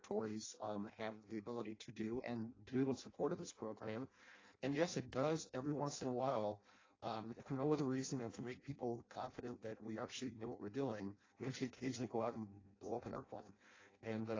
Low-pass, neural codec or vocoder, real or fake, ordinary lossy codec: 7.2 kHz; codec, 16 kHz in and 24 kHz out, 0.6 kbps, FireRedTTS-2 codec; fake; AAC, 32 kbps